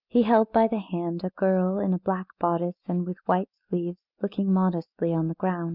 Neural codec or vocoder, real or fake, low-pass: none; real; 5.4 kHz